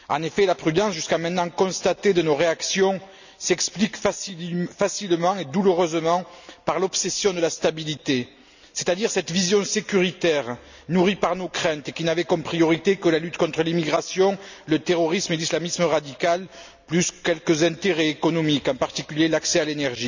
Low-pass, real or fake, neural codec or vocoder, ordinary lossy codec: 7.2 kHz; real; none; none